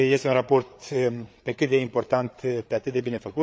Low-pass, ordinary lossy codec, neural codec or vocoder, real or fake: none; none; codec, 16 kHz, 8 kbps, FreqCodec, larger model; fake